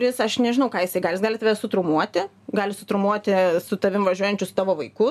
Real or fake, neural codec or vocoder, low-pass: real; none; 14.4 kHz